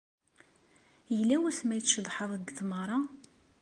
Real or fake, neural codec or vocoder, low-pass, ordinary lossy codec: real; none; 10.8 kHz; Opus, 32 kbps